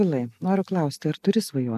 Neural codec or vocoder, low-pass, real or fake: none; 14.4 kHz; real